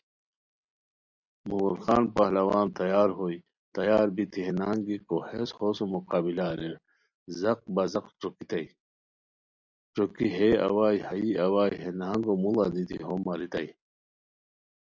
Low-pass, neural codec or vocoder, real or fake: 7.2 kHz; none; real